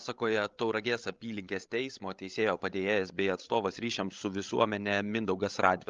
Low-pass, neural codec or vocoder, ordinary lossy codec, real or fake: 7.2 kHz; codec, 16 kHz, 16 kbps, FreqCodec, larger model; Opus, 24 kbps; fake